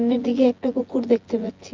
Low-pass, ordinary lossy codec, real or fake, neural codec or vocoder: 7.2 kHz; Opus, 24 kbps; fake; vocoder, 24 kHz, 100 mel bands, Vocos